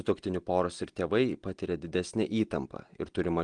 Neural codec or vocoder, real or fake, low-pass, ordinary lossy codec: none; real; 9.9 kHz; Opus, 24 kbps